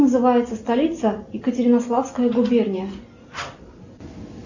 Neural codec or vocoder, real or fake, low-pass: none; real; 7.2 kHz